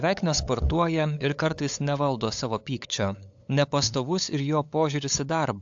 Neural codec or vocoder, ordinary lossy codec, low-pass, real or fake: codec, 16 kHz, 8 kbps, FunCodec, trained on LibriTTS, 25 frames a second; AAC, 64 kbps; 7.2 kHz; fake